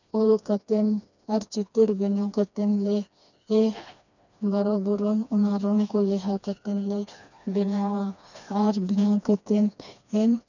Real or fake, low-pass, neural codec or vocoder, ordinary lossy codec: fake; 7.2 kHz; codec, 16 kHz, 2 kbps, FreqCodec, smaller model; none